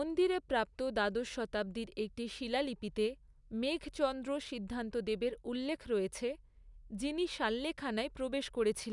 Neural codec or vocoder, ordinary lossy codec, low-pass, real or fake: none; none; 10.8 kHz; real